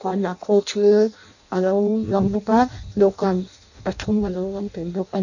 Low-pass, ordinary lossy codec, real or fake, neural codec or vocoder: 7.2 kHz; none; fake; codec, 16 kHz in and 24 kHz out, 0.6 kbps, FireRedTTS-2 codec